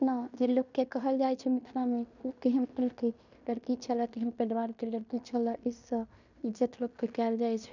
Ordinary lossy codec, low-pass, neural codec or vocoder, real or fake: none; 7.2 kHz; codec, 16 kHz in and 24 kHz out, 0.9 kbps, LongCat-Audio-Codec, fine tuned four codebook decoder; fake